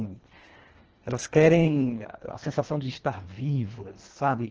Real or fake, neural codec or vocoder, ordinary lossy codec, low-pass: fake; codec, 24 kHz, 1.5 kbps, HILCodec; Opus, 16 kbps; 7.2 kHz